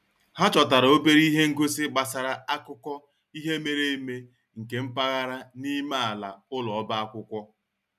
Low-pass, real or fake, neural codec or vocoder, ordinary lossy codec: 14.4 kHz; real; none; none